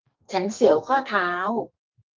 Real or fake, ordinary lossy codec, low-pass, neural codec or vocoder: fake; Opus, 24 kbps; 7.2 kHz; codec, 32 kHz, 1.9 kbps, SNAC